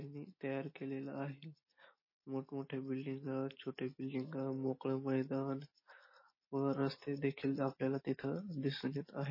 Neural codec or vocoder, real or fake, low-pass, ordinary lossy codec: none; real; 5.4 kHz; MP3, 24 kbps